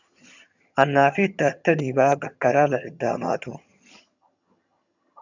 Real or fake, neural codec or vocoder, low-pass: fake; vocoder, 22.05 kHz, 80 mel bands, HiFi-GAN; 7.2 kHz